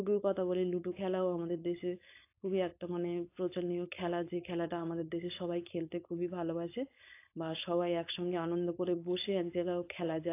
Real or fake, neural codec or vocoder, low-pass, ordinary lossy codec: fake; codec, 16 kHz, 4.8 kbps, FACodec; 3.6 kHz; AAC, 24 kbps